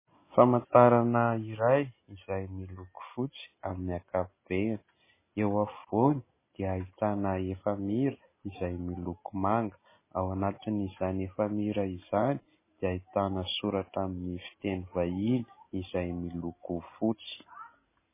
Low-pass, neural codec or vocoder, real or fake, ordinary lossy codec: 3.6 kHz; none; real; MP3, 16 kbps